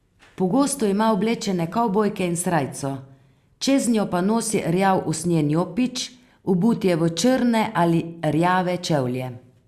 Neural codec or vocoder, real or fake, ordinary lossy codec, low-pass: none; real; Opus, 64 kbps; 14.4 kHz